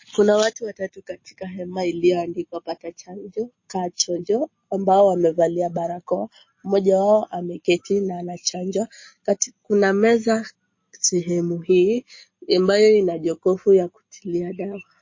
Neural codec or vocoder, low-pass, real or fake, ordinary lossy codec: none; 7.2 kHz; real; MP3, 32 kbps